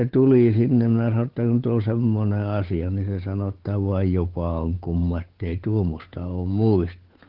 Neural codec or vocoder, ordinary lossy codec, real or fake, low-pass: codec, 16 kHz, 8 kbps, FunCodec, trained on Chinese and English, 25 frames a second; Opus, 16 kbps; fake; 5.4 kHz